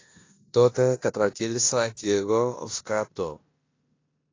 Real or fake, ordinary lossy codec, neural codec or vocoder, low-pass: fake; AAC, 32 kbps; codec, 16 kHz in and 24 kHz out, 0.9 kbps, LongCat-Audio-Codec, four codebook decoder; 7.2 kHz